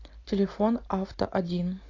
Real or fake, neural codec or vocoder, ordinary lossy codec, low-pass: real; none; AAC, 32 kbps; 7.2 kHz